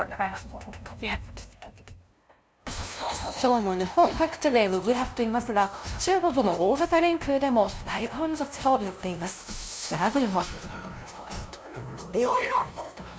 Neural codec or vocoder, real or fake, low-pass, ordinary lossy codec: codec, 16 kHz, 0.5 kbps, FunCodec, trained on LibriTTS, 25 frames a second; fake; none; none